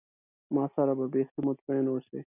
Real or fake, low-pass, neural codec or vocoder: real; 3.6 kHz; none